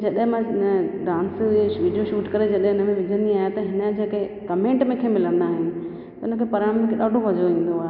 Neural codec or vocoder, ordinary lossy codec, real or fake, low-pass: none; none; real; 5.4 kHz